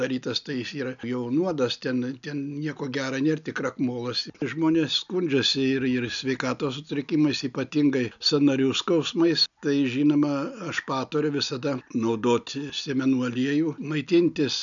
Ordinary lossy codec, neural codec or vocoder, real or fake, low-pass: MP3, 96 kbps; none; real; 7.2 kHz